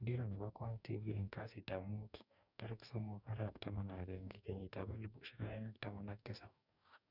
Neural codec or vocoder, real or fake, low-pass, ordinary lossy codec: codec, 44.1 kHz, 2.6 kbps, DAC; fake; 5.4 kHz; none